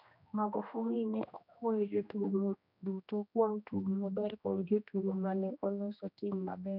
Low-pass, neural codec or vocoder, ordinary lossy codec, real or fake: 5.4 kHz; codec, 16 kHz, 1 kbps, X-Codec, HuBERT features, trained on general audio; none; fake